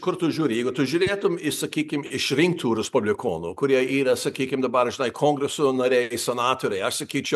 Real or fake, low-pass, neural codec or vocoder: real; 14.4 kHz; none